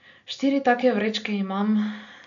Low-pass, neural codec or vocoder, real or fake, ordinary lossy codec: 7.2 kHz; none; real; none